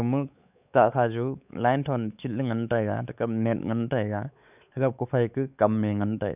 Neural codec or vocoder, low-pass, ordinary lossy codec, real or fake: codec, 16 kHz, 4 kbps, X-Codec, WavLM features, trained on Multilingual LibriSpeech; 3.6 kHz; none; fake